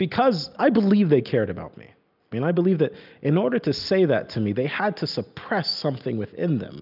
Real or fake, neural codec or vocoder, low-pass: real; none; 5.4 kHz